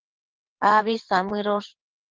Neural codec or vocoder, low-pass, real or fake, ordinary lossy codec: vocoder, 22.05 kHz, 80 mel bands, WaveNeXt; 7.2 kHz; fake; Opus, 16 kbps